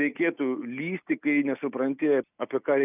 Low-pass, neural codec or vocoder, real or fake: 3.6 kHz; none; real